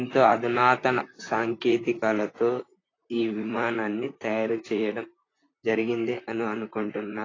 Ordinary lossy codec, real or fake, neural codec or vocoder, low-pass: AAC, 32 kbps; fake; vocoder, 44.1 kHz, 128 mel bands, Pupu-Vocoder; 7.2 kHz